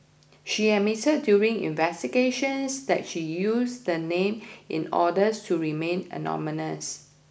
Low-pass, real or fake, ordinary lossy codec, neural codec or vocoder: none; real; none; none